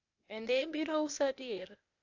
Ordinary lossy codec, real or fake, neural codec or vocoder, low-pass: AAC, 48 kbps; fake; codec, 16 kHz, 0.8 kbps, ZipCodec; 7.2 kHz